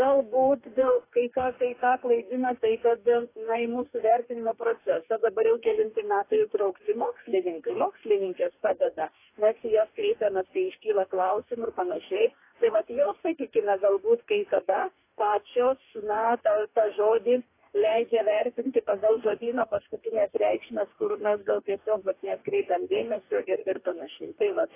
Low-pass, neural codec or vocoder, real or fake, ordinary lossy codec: 3.6 kHz; codec, 44.1 kHz, 2.6 kbps, DAC; fake; AAC, 24 kbps